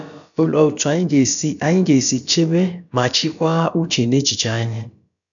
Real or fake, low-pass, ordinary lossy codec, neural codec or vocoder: fake; 7.2 kHz; none; codec, 16 kHz, about 1 kbps, DyCAST, with the encoder's durations